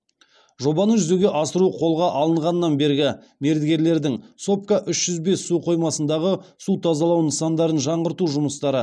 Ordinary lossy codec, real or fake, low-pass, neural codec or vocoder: none; real; none; none